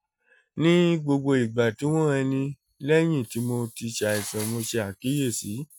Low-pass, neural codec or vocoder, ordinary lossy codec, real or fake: none; none; none; real